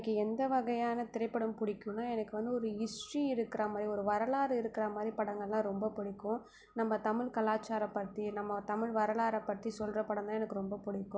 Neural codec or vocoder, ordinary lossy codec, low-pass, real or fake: none; none; none; real